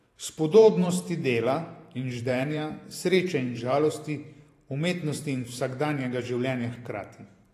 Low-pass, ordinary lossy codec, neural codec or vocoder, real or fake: 14.4 kHz; AAC, 48 kbps; vocoder, 44.1 kHz, 128 mel bands every 512 samples, BigVGAN v2; fake